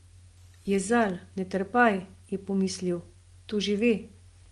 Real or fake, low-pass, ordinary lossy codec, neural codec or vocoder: real; 10.8 kHz; Opus, 24 kbps; none